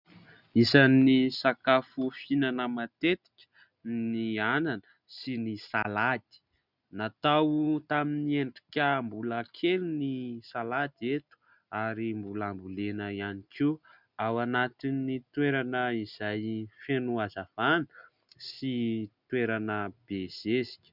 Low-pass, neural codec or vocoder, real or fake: 5.4 kHz; none; real